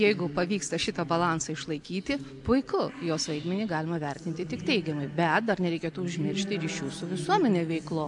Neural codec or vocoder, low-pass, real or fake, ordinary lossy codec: none; 10.8 kHz; real; AAC, 64 kbps